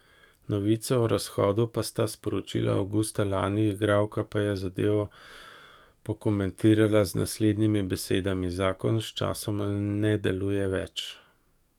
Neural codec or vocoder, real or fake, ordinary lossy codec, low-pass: codec, 44.1 kHz, 7.8 kbps, DAC; fake; none; 19.8 kHz